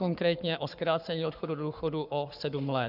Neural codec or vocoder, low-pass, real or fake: codec, 44.1 kHz, 7.8 kbps, Pupu-Codec; 5.4 kHz; fake